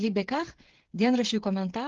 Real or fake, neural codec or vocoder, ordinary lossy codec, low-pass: fake; codec, 16 kHz, 8 kbps, FreqCodec, smaller model; Opus, 16 kbps; 7.2 kHz